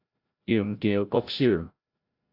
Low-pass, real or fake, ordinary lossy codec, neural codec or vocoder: 5.4 kHz; fake; AAC, 32 kbps; codec, 16 kHz, 0.5 kbps, FreqCodec, larger model